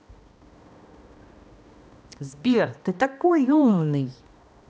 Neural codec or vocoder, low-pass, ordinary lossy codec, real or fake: codec, 16 kHz, 1 kbps, X-Codec, HuBERT features, trained on balanced general audio; none; none; fake